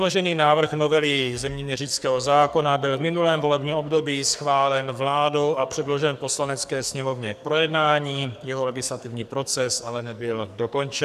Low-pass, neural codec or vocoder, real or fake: 14.4 kHz; codec, 44.1 kHz, 2.6 kbps, SNAC; fake